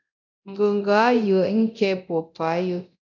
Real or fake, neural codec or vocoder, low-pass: fake; codec, 24 kHz, 0.9 kbps, DualCodec; 7.2 kHz